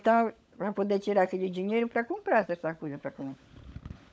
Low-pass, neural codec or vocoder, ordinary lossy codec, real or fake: none; codec, 16 kHz, 16 kbps, FunCodec, trained on LibriTTS, 50 frames a second; none; fake